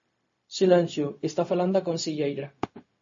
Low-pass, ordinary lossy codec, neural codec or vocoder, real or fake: 7.2 kHz; MP3, 32 kbps; codec, 16 kHz, 0.4 kbps, LongCat-Audio-Codec; fake